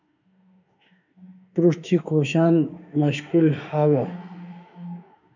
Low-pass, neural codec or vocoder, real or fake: 7.2 kHz; autoencoder, 48 kHz, 32 numbers a frame, DAC-VAE, trained on Japanese speech; fake